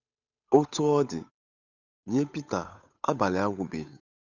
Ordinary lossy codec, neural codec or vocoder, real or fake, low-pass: none; codec, 16 kHz, 8 kbps, FunCodec, trained on Chinese and English, 25 frames a second; fake; 7.2 kHz